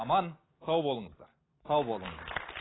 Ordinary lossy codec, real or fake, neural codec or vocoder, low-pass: AAC, 16 kbps; real; none; 7.2 kHz